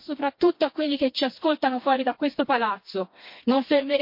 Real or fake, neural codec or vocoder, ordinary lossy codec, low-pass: fake; codec, 16 kHz, 2 kbps, FreqCodec, smaller model; MP3, 32 kbps; 5.4 kHz